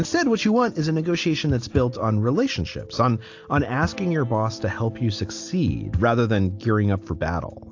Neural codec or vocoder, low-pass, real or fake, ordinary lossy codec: none; 7.2 kHz; real; AAC, 48 kbps